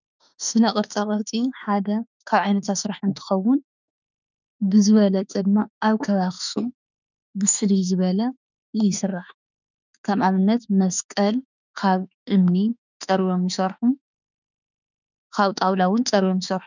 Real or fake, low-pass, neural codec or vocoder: fake; 7.2 kHz; autoencoder, 48 kHz, 32 numbers a frame, DAC-VAE, trained on Japanese speech